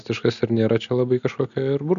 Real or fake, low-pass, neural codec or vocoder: real; 7.2 kHz; none